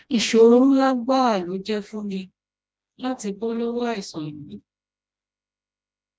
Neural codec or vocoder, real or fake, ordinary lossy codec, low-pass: codec, 16 kHz, 1 kbps, FreqCodec, smaller model; fake; none; none